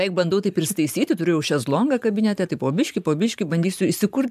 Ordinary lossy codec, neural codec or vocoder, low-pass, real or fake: MP3, 96 kbps; vocoder, 44.1 kHz, 128 mel bands every 512 samples, BigVGAN v2; 14.4 kHz; fake